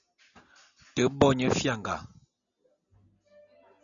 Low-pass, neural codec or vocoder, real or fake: 7.2 kHz; none; real